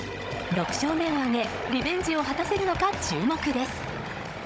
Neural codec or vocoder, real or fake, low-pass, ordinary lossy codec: codec, 16 kHz, 16 kbps, FunCodec, trained on Chinese and English, 50 frames a second; fake; none; none